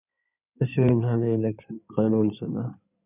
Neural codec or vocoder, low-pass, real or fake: codec, 16 kHz in and 24 kHz out, 2.2 kbps, FireRedTTS-2 codec; 3.6 kHz; fake